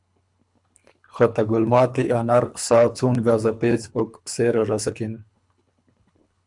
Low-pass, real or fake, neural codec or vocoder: 10.8 kHz; fake; codec, 24 kHz, 3 kbps, HILCodec